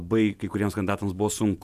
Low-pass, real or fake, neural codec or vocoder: 14.4 kHz; fake; vocoder, 48 kHz, 128 mel bands, Vocos